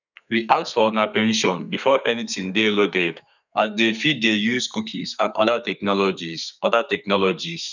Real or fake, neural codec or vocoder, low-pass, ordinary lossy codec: fake; codec, 32 kHz, 1.9 kbps, SNAC; 7.2 kHz; none